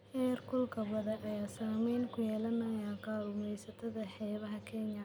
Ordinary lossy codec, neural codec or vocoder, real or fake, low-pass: none; none; real; none